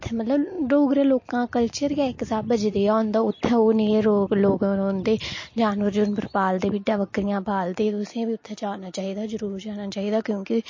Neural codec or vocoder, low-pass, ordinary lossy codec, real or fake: none; 7.2 kHz; MP3, 32 kbps; real